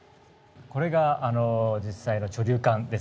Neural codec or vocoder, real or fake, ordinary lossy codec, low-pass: none; real; none; none